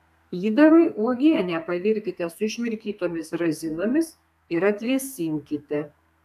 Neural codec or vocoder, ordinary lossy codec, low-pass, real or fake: codec, 32 kHz, 1.9 kbps, SNAC; AAC, 96 kbps; 14.4 kHz; fake